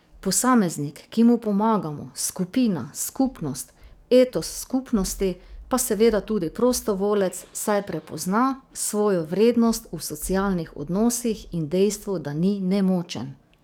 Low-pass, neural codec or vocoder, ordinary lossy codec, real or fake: none; codec, 44.1 kHz, 7.8 kbps, DAC; none; fake